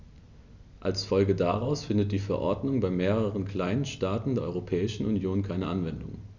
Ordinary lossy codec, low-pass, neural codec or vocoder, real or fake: none; 7.2 kHz; none; real